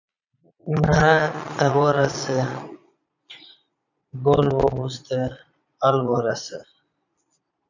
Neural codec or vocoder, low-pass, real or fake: vocoder, 22.05 kHz, 80 mel bands, Vocos; 7.2 kHz; fake